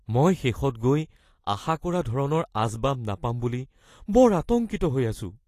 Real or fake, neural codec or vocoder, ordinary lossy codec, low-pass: real; none; AAC, 48 kbps; 14.4 kHz